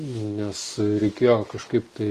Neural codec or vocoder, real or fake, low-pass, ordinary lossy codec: none; real; 14.4 kHz; Opus, 24 kbps